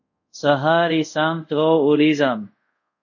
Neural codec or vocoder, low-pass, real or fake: codec, 24 kHz, 0.5 kbps, DualCodec; 7.2 kHz; fake